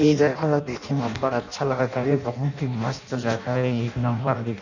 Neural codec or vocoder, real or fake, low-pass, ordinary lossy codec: codec, 16 kHz in and 24 kHz out, 0.6 kbps, FireRedTTS-2 codec; fake; 7.2 kHz; none